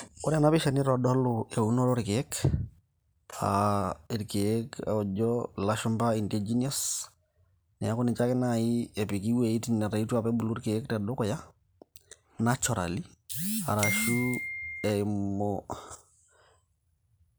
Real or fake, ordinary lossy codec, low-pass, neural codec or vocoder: real; none; none; none